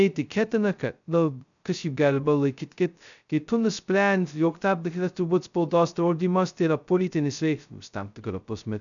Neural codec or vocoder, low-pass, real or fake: codec, 16 kHz, 0.2 kbps, FocalCodec; 7.2 kHz; fake